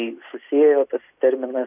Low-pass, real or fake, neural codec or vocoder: 3.6 kHz; real; none